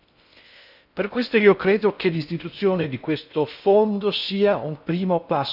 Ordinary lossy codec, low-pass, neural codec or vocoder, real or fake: none; 5.4 kHz; codec, 16 kHz in and 24 kHz out, 0.6 kbps, FocalCodec, streaming, 4096 codes; fake